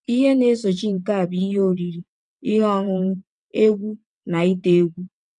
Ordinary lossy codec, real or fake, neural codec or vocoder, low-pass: none; fake; vocoder, 22.05 kHz, 80 mel bands, WaveNeXt; 9.9 kHz